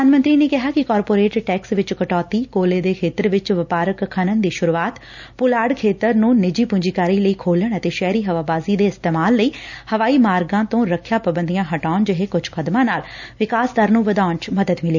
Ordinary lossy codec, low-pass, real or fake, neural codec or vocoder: none; 7.2 kHz; real; none